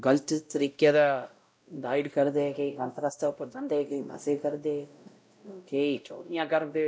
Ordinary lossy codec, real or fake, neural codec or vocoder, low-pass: none; fake; codec, 16 kHz, 0.5 kbps, X-Codec, WavLM features, trained on Multilingual LibriSpeech; none